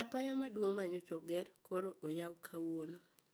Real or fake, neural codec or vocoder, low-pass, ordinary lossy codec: fake; codec, 44.1 kHz, 2.6 kbps, SNAC; none; none